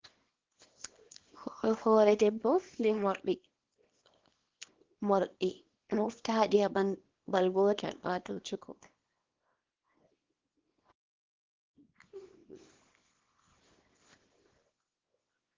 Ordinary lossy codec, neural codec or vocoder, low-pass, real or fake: Opus, 16 kbps; codec, 24 kHz, 0.9 kbps, WavTokenizer, small release; 7.2 kHz; fake